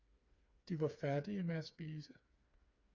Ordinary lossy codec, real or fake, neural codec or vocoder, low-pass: Opus, 64 kbps; fake; codec, 16 kHz, 4 kbps, FreqCodec, smaller model; 7.2 kHz